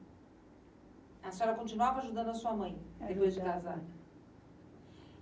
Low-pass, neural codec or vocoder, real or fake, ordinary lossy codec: none; none; real; none